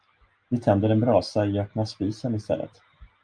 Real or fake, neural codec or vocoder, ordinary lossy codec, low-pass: real; none; Opus, 32 kbps; 9.9 kHz